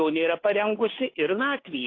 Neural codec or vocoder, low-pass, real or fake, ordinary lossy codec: codec, 16 kHz, 2 kbps, FunCodec, trained on Chinese and English, 25 frames a second; 7.2 kHz; fake; AAC, 32 kbps